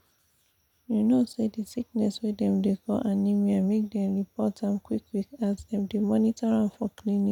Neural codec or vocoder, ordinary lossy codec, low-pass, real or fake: none; none; 19.8 kHz; real